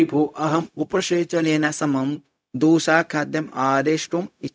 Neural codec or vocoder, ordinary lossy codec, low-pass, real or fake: codec, 16 kHz, 0.4 kbps, LongCat-Audio-Codec; none; none; fake